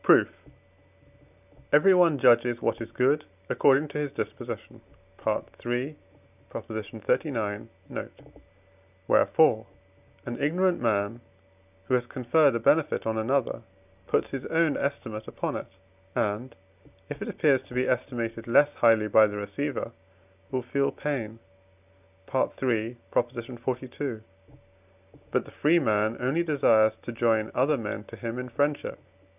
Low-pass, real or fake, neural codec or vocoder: 3.6 kHz; real; none